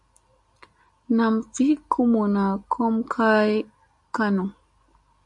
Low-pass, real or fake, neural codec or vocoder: 10.8 kHz; real; none